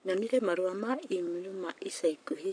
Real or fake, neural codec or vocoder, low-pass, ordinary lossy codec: fake; codec, 44.1 kHz, 7.8 kbps, Pupu-Codec; 9.9 kHz; none